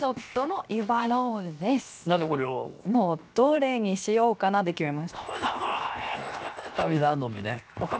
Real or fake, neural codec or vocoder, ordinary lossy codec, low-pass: fake; codec, 16 kHz, 0.7 kbps, FocalCodec; none; none